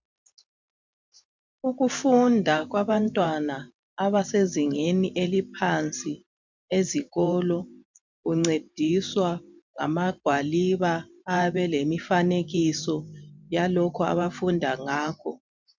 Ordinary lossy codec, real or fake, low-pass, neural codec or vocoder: MP3, 64 kbps; fake; 7.2 kHz; vocoder, 44.1 kHz, 128 mel bands every 512 samples, BigVGAN v2